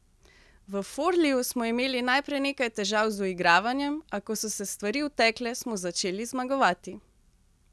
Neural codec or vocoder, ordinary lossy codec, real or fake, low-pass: none; none; real; none